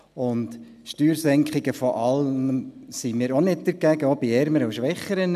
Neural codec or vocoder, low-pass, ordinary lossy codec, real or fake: none; 14.4 kHz; none; real